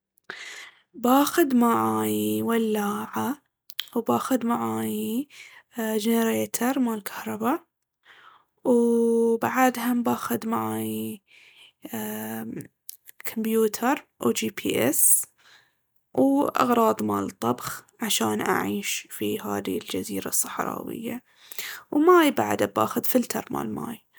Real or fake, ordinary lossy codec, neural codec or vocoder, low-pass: real; none; none; none